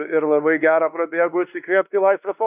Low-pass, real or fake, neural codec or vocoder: 3.6 kHz; fake; codec, 16 kHz, 2 kbps, X-Codec, WavLM features, trained on Multilingual LibriSpeech